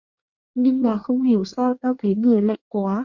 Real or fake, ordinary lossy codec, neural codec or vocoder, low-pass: fake; Opus, 64 kbps; codec, 24 kHz, 1 kbps, SNAC; 7.2 kHz